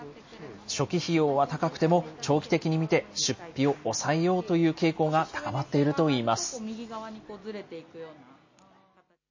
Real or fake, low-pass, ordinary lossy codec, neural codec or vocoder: real; 7.2 kHz; MP3, 32 kbps; none